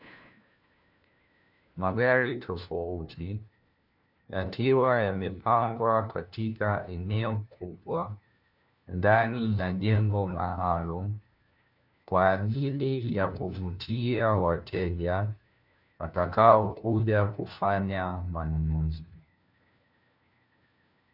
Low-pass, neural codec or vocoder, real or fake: 5.4 kHz; codec, 16 kHz, 1 kbps, FunCodec, trained on LibriTTS, 50 frames a second; fake